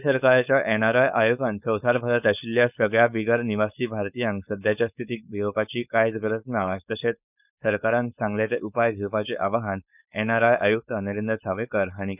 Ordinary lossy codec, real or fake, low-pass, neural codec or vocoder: none; fake; 3.6 kHz; codec, 16 kHz, 4.8 kbps, FACodec